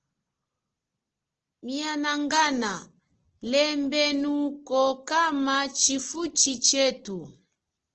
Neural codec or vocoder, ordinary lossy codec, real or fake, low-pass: none; Opus, 16 kbps; real; 7.2 kHz